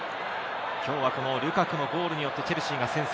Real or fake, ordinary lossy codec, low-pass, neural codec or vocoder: real; none; none; none